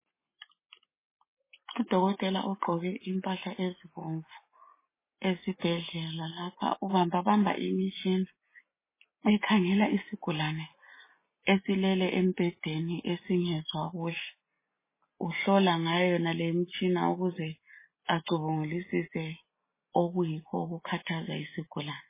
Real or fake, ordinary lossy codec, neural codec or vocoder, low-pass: real; MP3, 16 kbps; none; 3.6 kHz